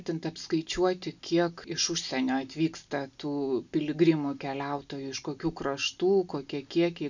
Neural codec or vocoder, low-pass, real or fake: none; 7.2 kHz; real